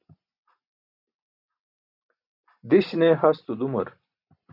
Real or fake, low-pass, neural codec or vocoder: real; 5.4 kHz; none